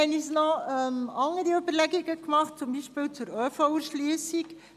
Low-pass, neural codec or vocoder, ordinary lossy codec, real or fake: 14.4 kHz; none; none; real